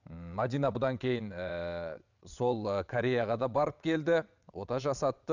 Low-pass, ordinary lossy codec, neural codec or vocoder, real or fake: 7.2 kHz; none; vocoder, 22.05 kHz, 80 mel bands, WaveNeXt; fake